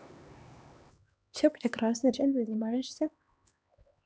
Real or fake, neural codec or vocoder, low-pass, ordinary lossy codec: fake; codec, 16 kHz, 2 kbps, X-Codec, HuBERT features, trained on LibriSpeech; none; none